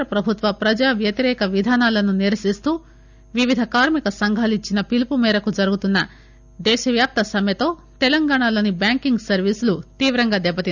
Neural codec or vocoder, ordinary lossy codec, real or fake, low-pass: none; none; real; 7.2 kHz